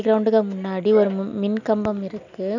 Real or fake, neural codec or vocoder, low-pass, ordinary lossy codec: real; none; 7.2 kHz; none